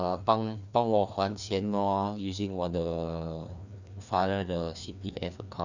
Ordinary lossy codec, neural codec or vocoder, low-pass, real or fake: none; codec, 16 kHz, 2 kbps, FreqCodec, larger model; 7.2 kHz; fake